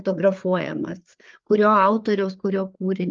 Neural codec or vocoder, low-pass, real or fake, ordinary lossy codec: codec, 16 kHz, 4 kbps, FreqCodec, larger model; 7.2 kHz; fake; Opus, 32 kbps